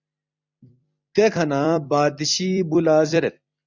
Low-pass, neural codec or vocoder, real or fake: 7.2 kHz; vocoder, 44.1 kHz, 128 mel bands every 256 samples, BigVGAN v2; fake